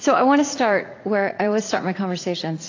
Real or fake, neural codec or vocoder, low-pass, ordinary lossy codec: fake; vocoder, 44.1 kHz, 80 mel bands, Vocos; 7.2 kHz; AAC, 32 kbps